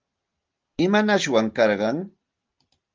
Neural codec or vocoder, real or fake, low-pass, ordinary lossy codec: none; real; 7.2 kHz; Opus, 32 kbps